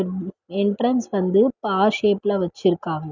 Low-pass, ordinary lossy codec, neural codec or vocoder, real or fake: 7.2 kHz; none; none; real